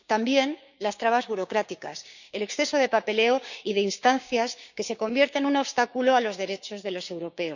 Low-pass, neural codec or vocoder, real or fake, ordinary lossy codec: 7.2 kHz; codec, 16 kHz, 6 kbps, DAC; fake; none